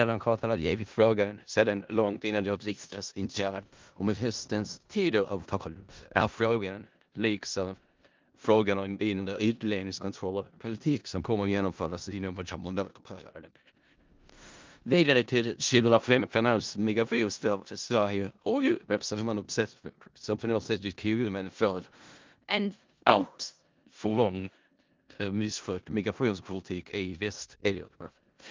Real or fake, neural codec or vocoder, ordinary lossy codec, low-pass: fake; codec, 16 kHz in and 24 kHz out, 0.4 kbps, LongCat-Audio-Codec, four codebook decoder; Opus, 32 kbps; 7.2 kHz